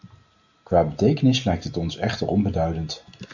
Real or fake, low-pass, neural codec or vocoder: real; 7.2 kHz; none